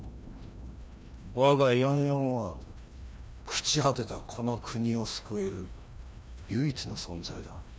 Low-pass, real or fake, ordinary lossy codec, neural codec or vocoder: none; fake; none; codec, 16 kHz, 1 kbps, FreqCodec, larger model